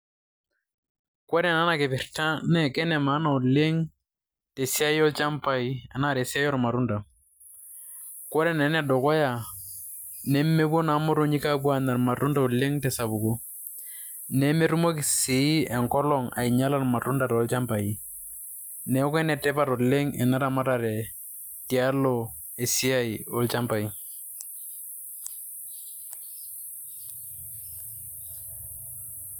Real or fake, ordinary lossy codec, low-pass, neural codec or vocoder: real; none; none; none